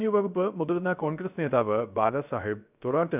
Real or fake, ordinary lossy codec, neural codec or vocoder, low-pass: fake; none; codec, 16 kHz, 0.3 kbps, FocalCodec; 3.6 kHz